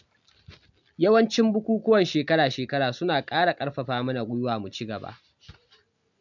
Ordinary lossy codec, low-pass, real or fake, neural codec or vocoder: MP3, 64 kbps; 7.2 kHz; real; none